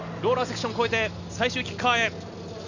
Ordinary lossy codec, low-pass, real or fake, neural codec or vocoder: none; 7.2 kHz; real; none